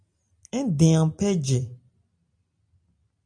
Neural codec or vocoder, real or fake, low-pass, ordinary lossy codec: none; real; 9.9 kHz; AAC, 64 kbps